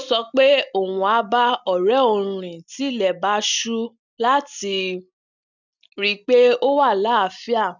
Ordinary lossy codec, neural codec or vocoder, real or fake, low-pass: none; none; real; 7.2 kHz